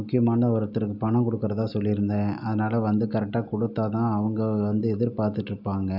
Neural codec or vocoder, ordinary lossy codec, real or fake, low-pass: none; none; real; 5.4 kHz